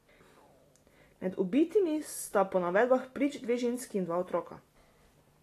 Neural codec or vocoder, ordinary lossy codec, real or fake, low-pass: none; AAC, 48 kbps; real; 14.4 kHz